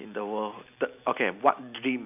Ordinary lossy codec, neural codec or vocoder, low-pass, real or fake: none; none; 3.6 kHz; real